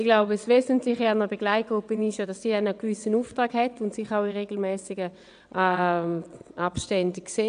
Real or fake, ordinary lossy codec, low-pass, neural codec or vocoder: fake; AAC, 96 kbps; 9.9 kHz; vocoder, 22.05 kHz, 80 mel bands, WaveNeXt